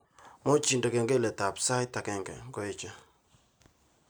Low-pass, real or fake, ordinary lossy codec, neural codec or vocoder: none; real; none; none